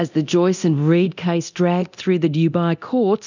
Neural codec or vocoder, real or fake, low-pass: codec, 24 kHz, 0.9 kbps, DualCodec; fake; 7.2 kHz